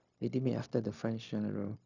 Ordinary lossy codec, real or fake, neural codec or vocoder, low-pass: none; fake; codec, 16 kHz, 0.4 kbps, LongCat-Audio-Codec; 7.2 kHz